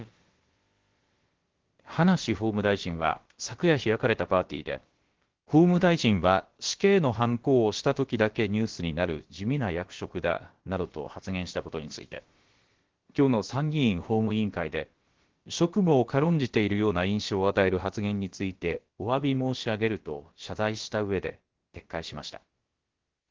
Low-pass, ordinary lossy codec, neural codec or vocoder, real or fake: 7.2 kHz; Opus, 16 kbps; codec, 16 kHz, about 1 kbps, DyCAST, with the encoder's durations; fake